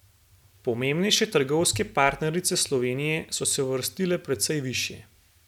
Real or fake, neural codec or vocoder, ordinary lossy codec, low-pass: real; none; none; 19.8 kHz